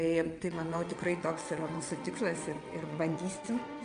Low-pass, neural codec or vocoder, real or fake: 9.9 kHz; vocoder, 22.05 kHz, 80 mel bands, WaveNeXt; fake